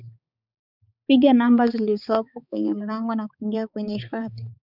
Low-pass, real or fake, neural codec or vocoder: 5.4 kHz; fake; codec, 16 kHz, 4 kbps, X-Codec, HuBERT features, trained on balanced general audio